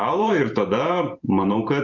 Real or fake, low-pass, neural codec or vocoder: fake; 7.2 kHz; vocoder, 44.1 kHz, 128 mel bands every 512 samples, BigVGAN v2